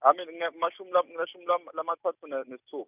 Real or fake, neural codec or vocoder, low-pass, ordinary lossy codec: real; none; 3.6 kHz; none